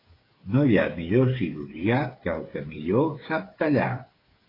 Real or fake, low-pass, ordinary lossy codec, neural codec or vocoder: fake; 5.4 kHz; AAC, 24 kbps; codec, 16 kHz, 8 kbps, FreqCodec, smaller model